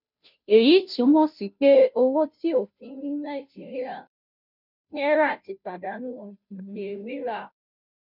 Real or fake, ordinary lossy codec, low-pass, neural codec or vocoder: fake; none; 5.4 kHz; codec, 16 kHz, 0.5 kbps, FunCodec, trained on Chinese and English, 25 frames a second